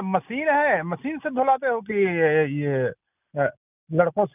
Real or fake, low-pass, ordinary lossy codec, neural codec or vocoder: real; 3.6 kHz; none; none